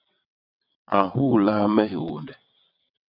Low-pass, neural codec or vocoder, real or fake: 5.4 kHz; vocoder, 22.05 kHz, 80 mel bands, WaveNeXt; fake